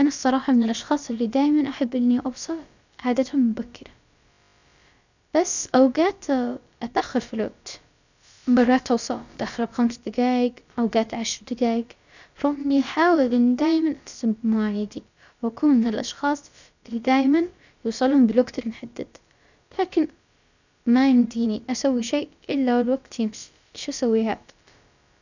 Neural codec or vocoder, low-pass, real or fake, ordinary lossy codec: codec, 16 kHz, about 1 kbps, DyCAST, with the encoder's durations; 7.2 kHz; fake; none